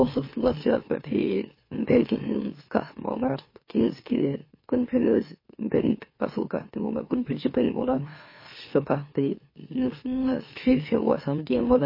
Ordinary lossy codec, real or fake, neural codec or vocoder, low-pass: MP3, 24 kbps; fake; autoencoder, 44.1 kHz, a latent of 192 numbers a frame, MeloTTS; 5.4 kHz